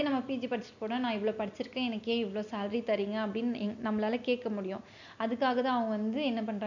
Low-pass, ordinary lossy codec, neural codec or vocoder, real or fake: 7.2 kHz; none; none; real